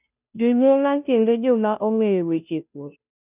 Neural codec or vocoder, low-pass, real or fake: codec, 16 kHz, 0.5 kbps, FunCodec, trained on Chinese and English, 25 frames a second; 3.6 kHz; fake